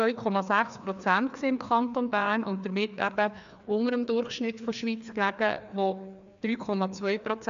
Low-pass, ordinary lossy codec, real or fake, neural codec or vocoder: 7.2 kHz; AAC, 96 kbps; fake; codec, 16 kHz, 2 kbps, FreqCodec, larger model